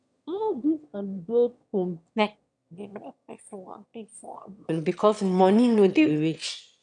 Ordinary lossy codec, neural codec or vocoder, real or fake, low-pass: none; autoencoder, 22.05 kHz, a latent of 192 numbers a frame, VITS, trained on one speaker; fake; 9.9 kHz